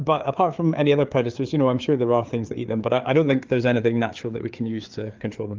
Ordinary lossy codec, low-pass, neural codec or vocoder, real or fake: Opus, 24 kbps; 7.2 kHz; codec, 16 kHz, 4 kbps, FreqCodec, larger model; fake